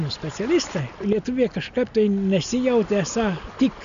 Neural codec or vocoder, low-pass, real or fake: none; 7.2 kHz; real